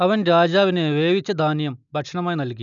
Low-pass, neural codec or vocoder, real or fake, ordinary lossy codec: 7.2 kHz; none; real; none